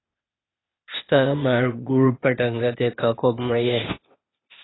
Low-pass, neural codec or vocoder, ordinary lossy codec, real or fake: 7.2 kHz; codec, 16 kHz, 0.8 kbps, ZipCodec; AAC, 16 kbps; fake